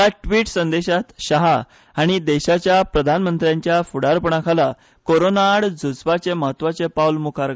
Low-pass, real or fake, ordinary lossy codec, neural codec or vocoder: none; real; none; none